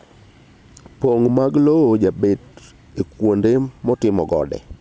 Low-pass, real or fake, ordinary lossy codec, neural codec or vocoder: none; real; none; none